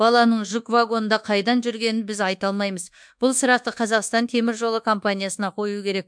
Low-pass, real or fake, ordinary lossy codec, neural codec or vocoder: 9.9 kHz; fake; MP3, 64 kbps; codec, 24 kHz, 1.2 kbps, DualCodec